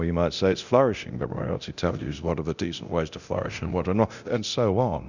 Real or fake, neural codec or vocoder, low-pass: fake; codec, 24 kHz, 0.9 kbps, DualCodec; 7.2 kHz